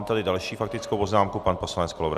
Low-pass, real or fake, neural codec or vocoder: 14.4 kHz; real; none